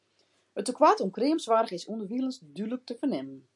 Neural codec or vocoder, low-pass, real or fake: none; 10.8 kHz; real